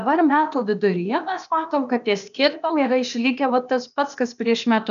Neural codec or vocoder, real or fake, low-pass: codec, 16 kHz, 0.8 kbps, ZipCodec; fake; 7.2 kHz